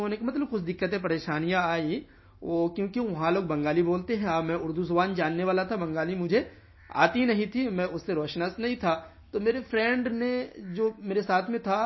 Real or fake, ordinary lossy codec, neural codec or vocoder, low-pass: real; MP3, 24 kbps; none; 7.2 kHz